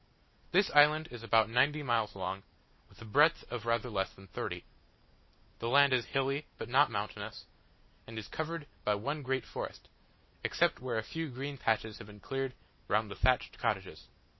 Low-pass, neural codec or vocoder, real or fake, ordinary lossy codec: 7.2 kHz; none; real; MP3, 24 kbps